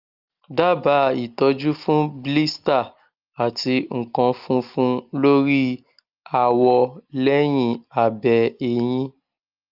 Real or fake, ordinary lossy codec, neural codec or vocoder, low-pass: real; Opus, 24 kbps; none; 5.4 kHz